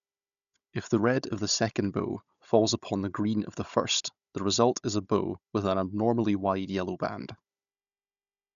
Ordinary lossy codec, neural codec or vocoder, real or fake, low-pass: none; codec, 16 kHz, 16 kbps, FunCodec, trained on Chinese and English, 50 frames a second; fake; 7.2 kHz